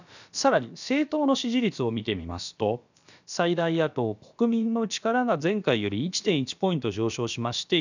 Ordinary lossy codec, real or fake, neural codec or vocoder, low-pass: none; fake; codec, 16 kHz, about 1 kbps, DyCAST, with the encoder's durations; 7.2 kHz